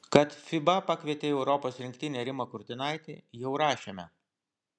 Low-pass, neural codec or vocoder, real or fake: 9.9 kHz; none; real